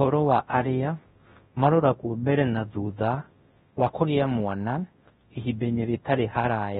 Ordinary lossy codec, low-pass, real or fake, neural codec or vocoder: AAC, 16 kbps; 10.8 kHz; fake; codec, 24 kHz, 0.9 kbps, WavTokenizer, large speech release